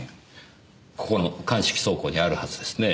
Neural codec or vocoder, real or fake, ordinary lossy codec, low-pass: none; real; none; none